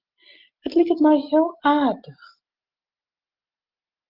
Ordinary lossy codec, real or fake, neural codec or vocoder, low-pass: Opus, 24 kbps; real; none; 5.4 kHz